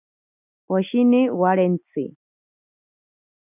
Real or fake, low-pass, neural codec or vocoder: real; 3.6 kHz; none